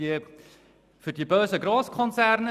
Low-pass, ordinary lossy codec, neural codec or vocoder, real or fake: 14.4 kHz; none; none; real